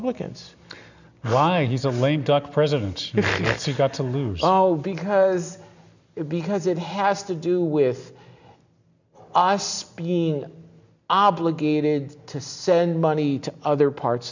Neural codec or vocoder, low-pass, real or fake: none; 7.2 kHz; real